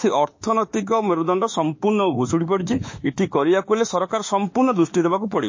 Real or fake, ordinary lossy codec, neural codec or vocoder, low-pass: fake; MP3, 32 kbps; autoencoder, 48 kHz, 32 numbers a frame, DAC-VAE, trained on Japanese speech; 7.2 kHz